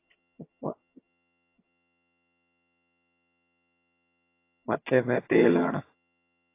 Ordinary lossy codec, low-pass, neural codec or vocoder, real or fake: AAC, 24 kbps; 3.6 kHz; vocoder, 22.05 kHz, 80 mel bands, HiFi-GAN; fake